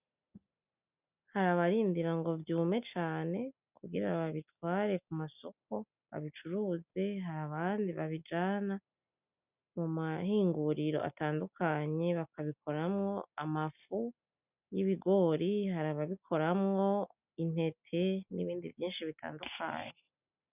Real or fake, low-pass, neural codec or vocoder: real; 3.6 kHz; none